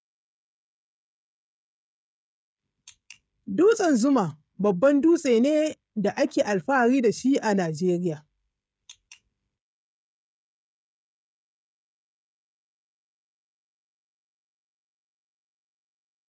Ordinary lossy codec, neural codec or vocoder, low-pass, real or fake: none; codec, 16 kHz, 16 kbps, FreqCodec, smaller model; none; fake